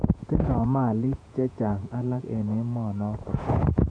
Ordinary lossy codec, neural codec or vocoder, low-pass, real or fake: none; vocoder, 48 kHz, 128 mel bands, Vocos; 9.9 kHz; fake